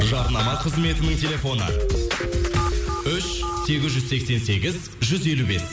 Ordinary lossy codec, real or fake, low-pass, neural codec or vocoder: none; real; none; none